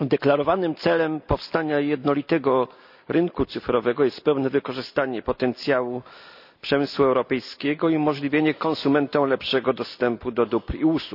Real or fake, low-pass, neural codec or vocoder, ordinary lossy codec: real; 5.4 kHz; none; none